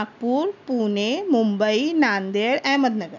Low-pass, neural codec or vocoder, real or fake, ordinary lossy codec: 7.2 kHz; none; real; none